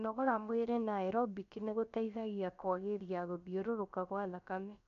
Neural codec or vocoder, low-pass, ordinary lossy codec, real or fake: codec, 16 kHz, about 1 kbps, DyCAST, with the encoder's durations; 7.2 kHz; none; fake